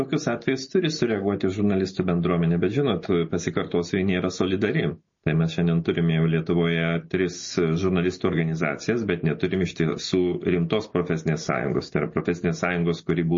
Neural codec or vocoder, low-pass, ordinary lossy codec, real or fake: none; 7.2 kHz; MP3, 32 kbps; real